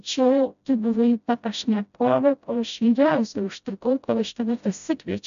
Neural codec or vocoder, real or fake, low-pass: codec, 16 kHz, 0.5 kbps, FreqCodec, smaller model; fake; 7.2 kHz